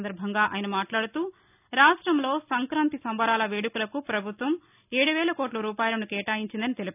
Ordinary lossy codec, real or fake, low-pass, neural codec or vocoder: none; real; 3.6 kHz; none